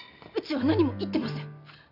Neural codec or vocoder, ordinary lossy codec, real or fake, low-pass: none; none; real; 5.4 kHz